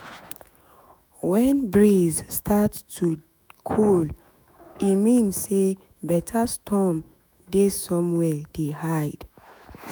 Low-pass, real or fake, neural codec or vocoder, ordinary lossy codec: none; fake; autoencoder, 48 kHz, 128 numbers a frame, DAC-VAE, trained on Japanese speech; none